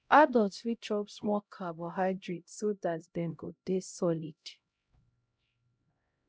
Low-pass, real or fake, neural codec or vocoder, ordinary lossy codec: none; fake; codec, 16 kHz, 0.5 kbps, X-Codec, HuBERT features, trained on LibriSpeech; none